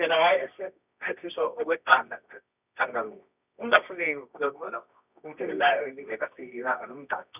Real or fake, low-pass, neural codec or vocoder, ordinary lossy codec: fake; 3.6 kHz; codec, 24 kHz, 0.9 kbps, WavTokenizer, medium music audio release; none